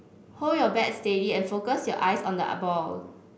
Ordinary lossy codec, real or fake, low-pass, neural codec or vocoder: none; real; none; none